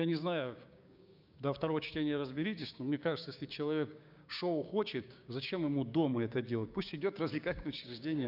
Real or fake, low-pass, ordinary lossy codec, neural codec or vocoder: fake; 5.4 kHz; none; codec, 16 kHz, 6 kbps, DAC